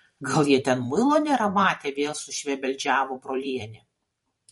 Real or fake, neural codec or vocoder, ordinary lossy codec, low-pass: fake; vocoder, 44.1 kHz, 128 mel bands every 512 samples, BigVGAN v2; MP3, 48 kbps; 19.8 kHz